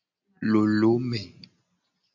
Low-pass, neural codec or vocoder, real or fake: 7.2 kHz; none; real